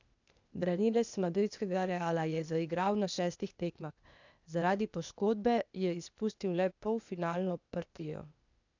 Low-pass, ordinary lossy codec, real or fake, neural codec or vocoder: 7.2 kHz; none; fake; codec, 16 kHz, 0.8 kbps, ZipCodec